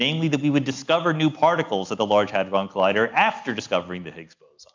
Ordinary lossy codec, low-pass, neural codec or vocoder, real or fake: MP3, 64 kbps; 7.2 kHz; none; real